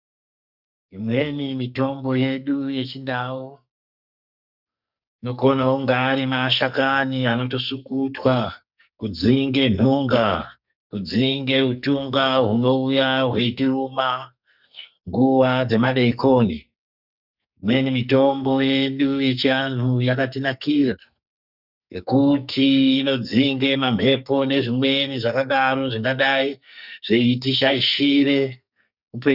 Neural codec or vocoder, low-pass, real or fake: codec, 44.1 kHz, 2.6 kbps, SNAC; 5.4 kHz; fake